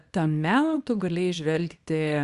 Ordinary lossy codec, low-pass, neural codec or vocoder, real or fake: Opus, 64 kbps; 10.8 kHz; codec, 24 kHz, 0.9 kbps, WavTokenizer, small release; fake